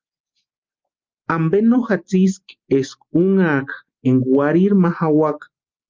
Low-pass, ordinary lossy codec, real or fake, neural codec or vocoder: 7.2 kHz; Opus, 32 kbps; real; none